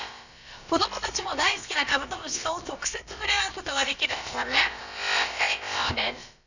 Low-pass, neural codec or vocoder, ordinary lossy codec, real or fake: 7.2 kHz; codec, 16 kHz, about 1 kbps, DyCAST, with the encoder's durations; none; fake